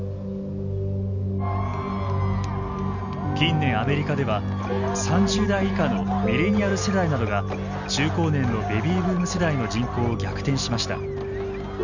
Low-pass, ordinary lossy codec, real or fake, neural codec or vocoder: 7.2 kHz; none; real; none